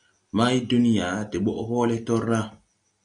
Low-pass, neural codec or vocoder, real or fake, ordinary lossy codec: 9.9 kHz; none; real; Opus, 64 kbps